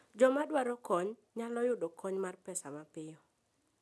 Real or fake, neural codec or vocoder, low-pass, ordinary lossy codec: real; none; none; none